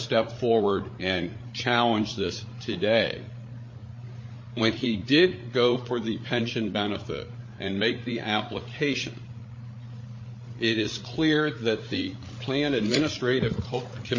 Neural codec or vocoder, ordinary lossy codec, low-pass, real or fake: codec, 16 kHz, 8 kbps, FreqCodec, larger model; MP3, 32 kbps; 7.2 kHz; fake